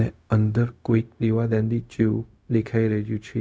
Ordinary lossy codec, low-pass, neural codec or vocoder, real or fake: none; none; codec, 16 kHz, 0.4 kbps, LongCat-Audio-Codec; fake